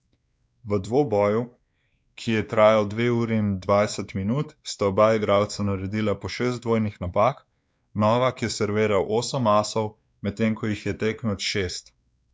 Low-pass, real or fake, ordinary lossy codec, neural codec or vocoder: none; fake; none; codec, 16 kHz, 2 kbps, X-Codec, WavLM features, trained on Multilingual LibriSpeech